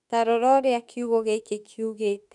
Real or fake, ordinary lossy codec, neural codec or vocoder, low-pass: fake; none; autoencoder, 48 kHz, 32 numbers a frame, DAC-VAE, trained on Japanese speech; 10.8 kHz